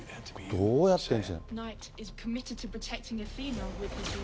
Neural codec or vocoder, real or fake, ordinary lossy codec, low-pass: none; real; none; none